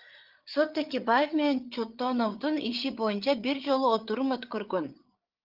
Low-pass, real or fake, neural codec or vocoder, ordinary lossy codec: 5.4 kHz; fake; codec, 16 kHz, 8 kbps, FreqCodec, larger model; Opus, 32 kbps